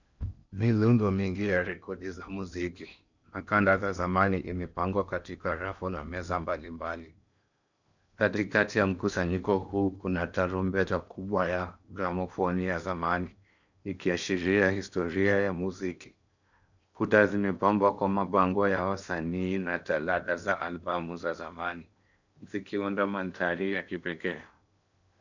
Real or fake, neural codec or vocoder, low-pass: fake; codec, 16 kHz in and 24 kHz out, 0.8 kbps, FocalCodec, streaming, 65536 codes; 7.2 kHz